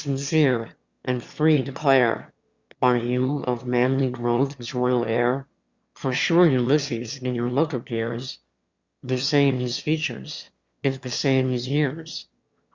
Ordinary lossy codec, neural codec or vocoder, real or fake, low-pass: Opus, 64 kbps; autoencoder, 22.05 kHz, a latent of 192 numbers a frame, VITS, trained on one speaker; fake; 7.2 kHz